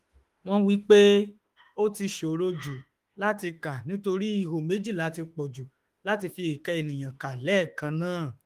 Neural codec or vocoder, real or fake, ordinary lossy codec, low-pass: autoencoder, 48 kHz, 32 numbers a frame, DAC-VAE, trained on Japanese speech; fake; Opus, 24 kbps; 14.4 kHz